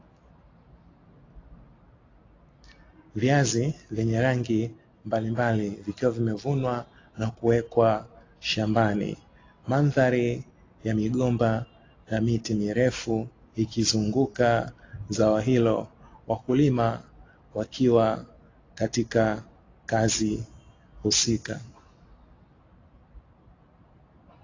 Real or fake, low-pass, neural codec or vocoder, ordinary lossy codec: real; 7.2 kHz; none; AAC, 32 kbps